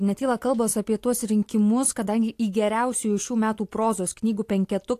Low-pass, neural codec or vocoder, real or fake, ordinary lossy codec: 14.4 kHz; none; real; AAC, 64 kbps